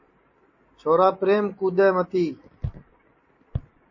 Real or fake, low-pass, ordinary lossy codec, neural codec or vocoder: fake; 7.2 kHz; MP3, 32 kbps; vocoder, 44.1 kHz, 128 mel bands every 256 samples, BigVGAN v2